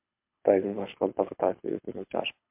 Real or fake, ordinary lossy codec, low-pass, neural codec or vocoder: fake; MP3, 32 kbps; 3.6 kHz; codec, 24 kHz, 6 kbps, HILCodec